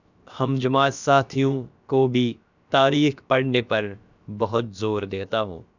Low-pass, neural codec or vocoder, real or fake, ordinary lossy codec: 7.2 kHz; codec, 16 kHz, about 1 kbps, DyCAST, with the encoder's durations; fake; none